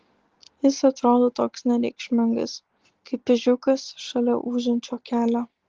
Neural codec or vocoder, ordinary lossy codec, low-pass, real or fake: none; Opus, 16 kbps; 7.2 kHz; real